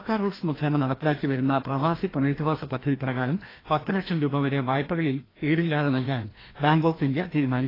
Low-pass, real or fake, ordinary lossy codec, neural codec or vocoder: 5.4 kHz; fake; AAC, 24 kbps; codec, 16 kHz, 1 kbps, FreqCodec, larger model